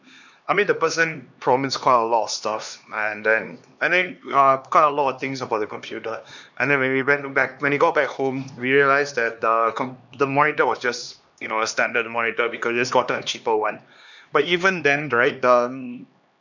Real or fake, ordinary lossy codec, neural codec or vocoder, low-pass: fake; none; codec, 16 kHz, 2 kbps, X-Codec, HuBERT features, trained on LibriSpeech; 7.2 kHz